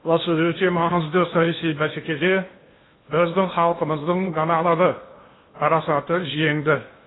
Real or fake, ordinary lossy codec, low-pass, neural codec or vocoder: fake; AAC, 16 kbps; 7.2 kHz; codec, 16 kHz in and 24 kHz out, 0.8 kbps, FocalCodec, streaming, 65536 codes